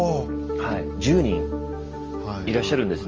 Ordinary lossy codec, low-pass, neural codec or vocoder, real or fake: Opus, 24 kbps; 7.2 kHz; none; real